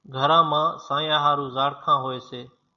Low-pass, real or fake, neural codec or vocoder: 7.2 kHz; real; none